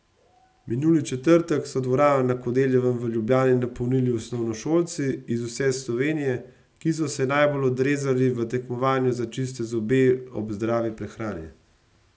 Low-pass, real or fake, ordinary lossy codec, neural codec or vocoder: none; real; none; none